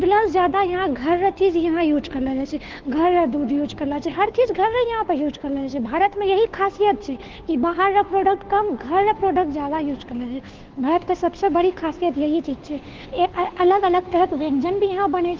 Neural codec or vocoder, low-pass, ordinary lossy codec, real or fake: codec, 16 kHz, 2 kbps, FunCodec, trained on Chinese and English, 25 frames a second; 7.2 kHz; Opus, 16 kbps; fake